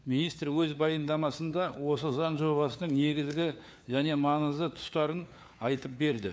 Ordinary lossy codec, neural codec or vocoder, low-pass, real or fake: none; codec, 16 kHz, 4 kbps, FunCodec, trained on LibriTTS, 50 frames a second; none; fake